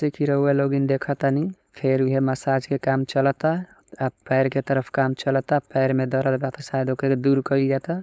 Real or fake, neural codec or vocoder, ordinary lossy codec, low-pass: fake; codec, 16 kHz, 4.8 kbps, FACodec; none; none